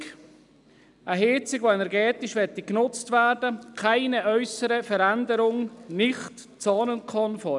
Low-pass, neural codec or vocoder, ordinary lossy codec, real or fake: 10.8 kHz; none; none; real